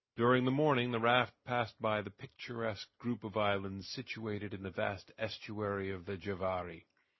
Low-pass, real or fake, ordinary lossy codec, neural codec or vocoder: 7.2 kHz; real; MP3, 24 kbps; none